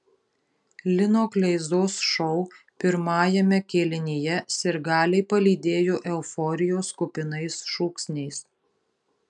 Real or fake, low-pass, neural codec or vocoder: real; 10.8 kHz; none